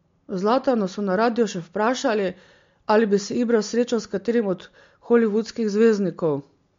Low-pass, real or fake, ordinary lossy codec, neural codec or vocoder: 7.2 kHz; real; MP3, 48 kbps; none